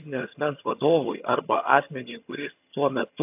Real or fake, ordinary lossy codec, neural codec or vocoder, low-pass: fake; AAC, 32 kbps; vocoder, 22.05 kHz, 80 mel bands, HiFi-GAN; 3.6 kHz